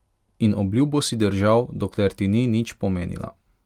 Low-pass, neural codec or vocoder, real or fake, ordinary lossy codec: 19.8 kHz; none; real; Opus, 32 kbps